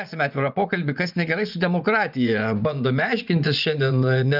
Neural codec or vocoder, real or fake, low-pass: vocoder, 24 kHz, 100 mel bands, Vocos; fake; 5.4 kHz